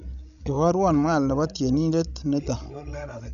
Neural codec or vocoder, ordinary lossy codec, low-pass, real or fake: codec, 16 kHz, 16 kbps, FreqCodec, larger model; none; 7.2 kHz; fake